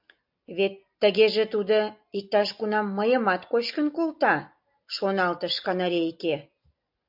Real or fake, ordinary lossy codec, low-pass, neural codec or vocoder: real; MP3, 48 kbps; 5.4 kHz; none